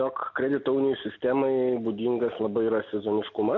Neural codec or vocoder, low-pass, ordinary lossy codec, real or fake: none; 7.2 kHz; MP3, 48 kbps; real